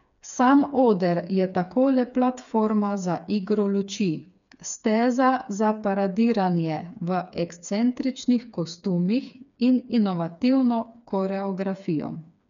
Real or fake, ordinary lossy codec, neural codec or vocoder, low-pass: fake; none; codec, 16 kHz, 4 kbps, FreqCodec, smaller model; 7.2 kHz